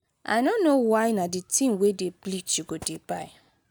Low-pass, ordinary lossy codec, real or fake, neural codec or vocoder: none; none; real; none